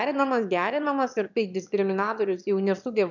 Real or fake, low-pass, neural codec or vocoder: fake; 7.2 kHz; autoencoder, 22.05 kHz, a latent of 192 numbers a frame, VITS, trained on one speaker